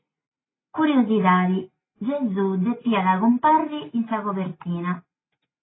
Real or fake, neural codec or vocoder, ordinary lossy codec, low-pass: real; none; AAC, 16 kbps; 7.2 kHz